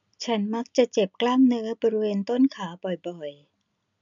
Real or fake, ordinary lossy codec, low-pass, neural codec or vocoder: real; none; 7.2 kHz; none